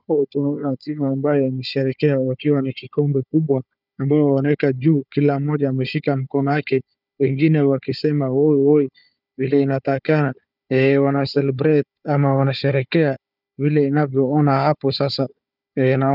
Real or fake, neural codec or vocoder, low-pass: fake; codec, 16 kHz, 4 kbps, FunCodec, trained on Chinese and English, 50 frames a second; 5.4 kHz